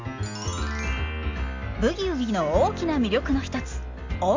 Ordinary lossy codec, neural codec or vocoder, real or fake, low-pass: MP3, 48 kbps; none; real; 7.2 kHz